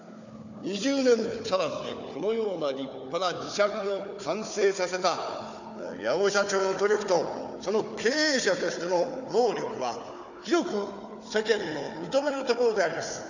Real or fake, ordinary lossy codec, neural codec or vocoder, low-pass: fake; none; codec, 16 kHz, 4 kbps, FunCodec, trained on Chinese and English, 50 frames a second; 7.2 kHz